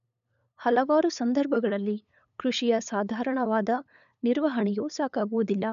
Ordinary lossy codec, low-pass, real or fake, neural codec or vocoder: none; 7.2 kHz; fake; codec, 16 kHz, 8 kbps, FunCodec, trained on LibriTTS, 25 frames a second